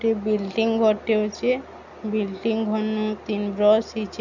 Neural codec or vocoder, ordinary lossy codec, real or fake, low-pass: none; none; real; 7.2 kHz